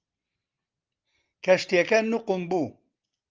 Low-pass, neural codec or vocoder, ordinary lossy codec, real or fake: 7.2 kHz; none; Opus, 24 kbps; real